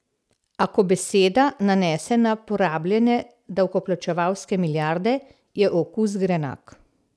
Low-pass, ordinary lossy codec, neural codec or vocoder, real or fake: none; none; none; real